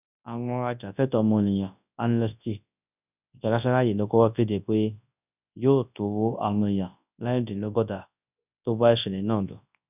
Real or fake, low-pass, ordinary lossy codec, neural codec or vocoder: fake; 3.6 kHz; none; codec, 24 kHz, 0.9 kbps, WavTokenizer, large speech release